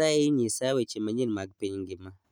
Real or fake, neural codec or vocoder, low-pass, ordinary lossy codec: real; none; none; none